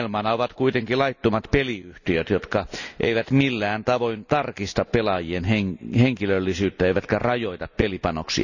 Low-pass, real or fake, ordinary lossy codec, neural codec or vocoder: 7.2 kHz; real; none; none